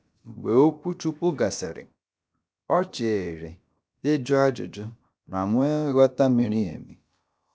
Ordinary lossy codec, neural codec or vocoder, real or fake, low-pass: none; codec, 16 kHz, about 1 kbps, DyCAST, with the encoder's durations; fake; none